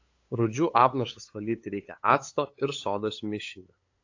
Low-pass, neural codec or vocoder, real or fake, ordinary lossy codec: 7.2 kHz; codec, 16 kHz, 8 kbps, FunCodec, trained on LibriTTS, 25 frames a second; fake; AAC, 48 kbps